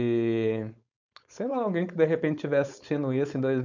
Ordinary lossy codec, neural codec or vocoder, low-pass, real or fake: none; codec, 16 kHz, 4.8 kbps, FACodec; 7.2 kHz; fake